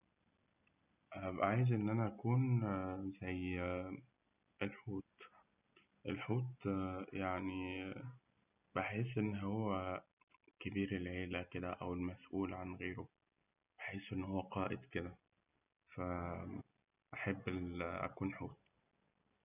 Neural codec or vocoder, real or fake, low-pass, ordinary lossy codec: none; real; 3.6 kHz; none